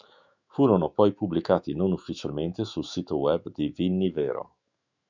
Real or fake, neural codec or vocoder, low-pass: fake; autoencoder, 48 kHz, 128 numbers a frame, DAC-VAE, trained on Japanese speech; 7.2 kHz